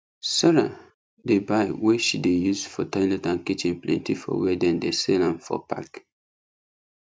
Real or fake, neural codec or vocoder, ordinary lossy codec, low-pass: real; none; none; none